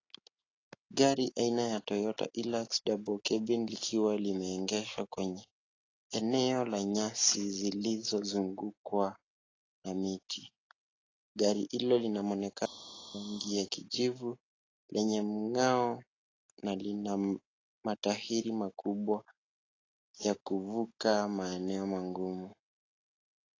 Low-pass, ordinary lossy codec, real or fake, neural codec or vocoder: 7.2 kHz; AAC, 32 kbps; real; none